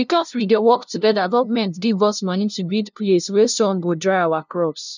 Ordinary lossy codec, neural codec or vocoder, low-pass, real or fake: none; codec, 16 kHz, 0.5 kbps, FunCodec, trained on LibriTTS, 25 frames a second; 7.2 kHz; fake